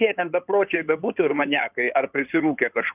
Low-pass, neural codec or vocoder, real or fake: 3.6 kHz; codec, 16 kHz in and 24 kHz out, 2.2 kbps, FireRedTTS-2 codec; fake